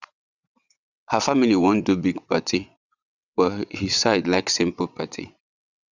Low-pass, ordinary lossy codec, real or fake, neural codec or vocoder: 7.2 kHz; none; fake; vocoder, 22.05 kHz, 80 mel bands, WaveNeXt